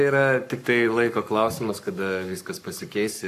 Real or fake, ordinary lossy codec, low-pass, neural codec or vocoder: fake; AAC, 64 kbps; 14.4 kHz; codec, 44.1 kHz, 7.8 kbps, Pupu-Codec